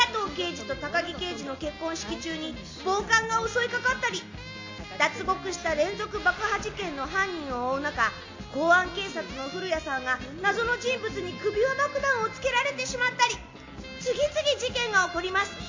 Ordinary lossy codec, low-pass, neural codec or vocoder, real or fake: MP3, 48 kbps; 7.2 kHz; none; real